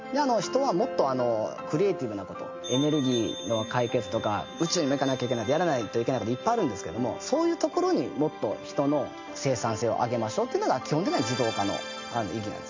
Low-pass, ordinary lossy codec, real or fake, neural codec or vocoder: 7.2 kHz; MP3, 48 kbps; real; none